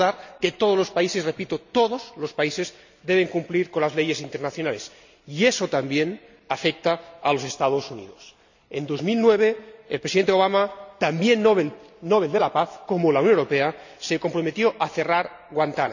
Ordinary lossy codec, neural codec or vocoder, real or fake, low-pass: none; none; real; 7.2 kHz